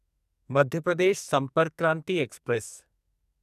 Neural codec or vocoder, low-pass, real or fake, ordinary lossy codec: codec, 44.1 kHz, 2.6 kbps, SNAC; 14.4 kHz; fake; none